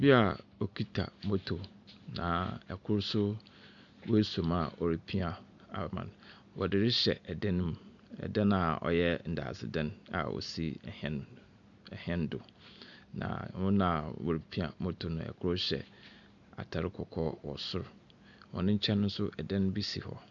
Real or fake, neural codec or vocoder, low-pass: real; none; 7.2 kHz